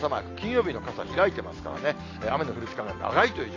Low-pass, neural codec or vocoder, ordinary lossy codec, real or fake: 7.2 kHz; none; AAC, 32 kbps; real